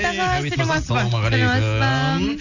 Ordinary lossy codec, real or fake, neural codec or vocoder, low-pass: none; real; none; 7.2 kHz